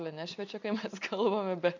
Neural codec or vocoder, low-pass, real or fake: none; 7.2 kHz; real